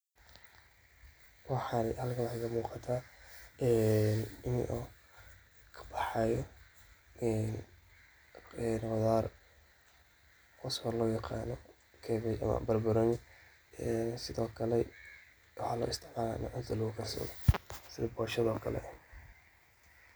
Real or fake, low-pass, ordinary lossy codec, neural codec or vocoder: real; none; none; none